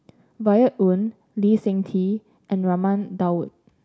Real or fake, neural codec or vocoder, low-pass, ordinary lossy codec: real; none; none; none